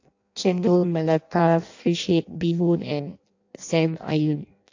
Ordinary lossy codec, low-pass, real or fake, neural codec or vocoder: AAC, 48 kbps; 7.2 kHz; fake; codec, 16 kHz in and 24 kHz out, 0.6 kbps, FireRedTTS-2 codec